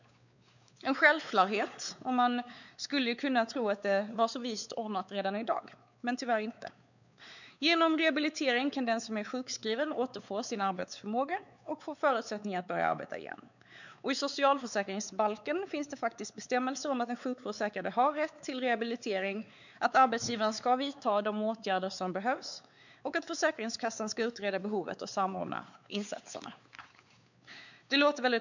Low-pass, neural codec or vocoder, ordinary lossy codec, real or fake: 7.2 kHz; codec, 16 kHz, 4 kbps, X-Codec, WavLM features, trained on Multilingual LibriSpeech; none; fake